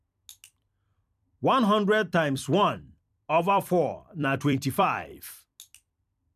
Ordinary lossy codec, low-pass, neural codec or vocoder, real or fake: none; 14.4 kHz; vocoder, 44.1 kHz, 128 mel bands every 256 samples, BigVGAN v2; fake